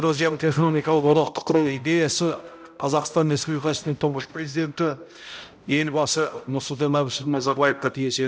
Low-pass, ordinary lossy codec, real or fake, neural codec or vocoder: none; none; fake; codec, 16 kHz, 0.5 kbps, X-Codec, HuBERT features, trained on balanced general audio